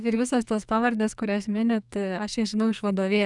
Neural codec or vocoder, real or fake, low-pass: codec, 44.1 kHz, 2.6 kbps, SNAC; fake; 10.8 kHz